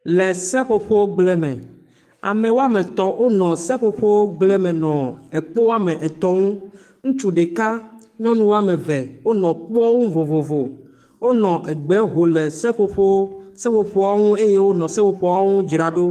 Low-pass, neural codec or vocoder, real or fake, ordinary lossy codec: 14.4 kHz; codec, 44.1 kHz, 2.6 kbps, SNAC; fake; Opus, 32 kbps